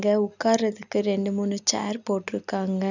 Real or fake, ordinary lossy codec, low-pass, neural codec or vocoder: real; none; 7.2 kHz; none